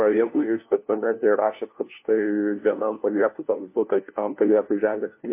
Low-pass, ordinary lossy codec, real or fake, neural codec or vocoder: 3.6 kHz; MP3, 24 kbps; fake; codec, 16 kHz, 1 kbps, FunCodec, trained on LibriTTS, 50 frames a second